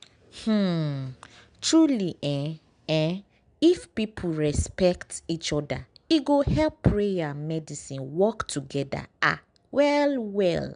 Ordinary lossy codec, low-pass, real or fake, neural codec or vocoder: MP3, 96 kbps; 9.9 kHz; real; none